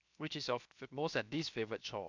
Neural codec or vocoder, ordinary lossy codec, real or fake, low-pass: codec, 16 kHz, 0.7 kbps, FocalCodec; MP3, 64 kbps; fake; 7.2 kHz